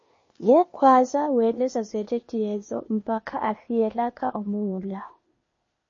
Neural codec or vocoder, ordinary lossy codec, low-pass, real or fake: codec, 16 kHz, 0.8 kbps, ZipCodec; MP3, 32 kbps; 7.2 kHz; fake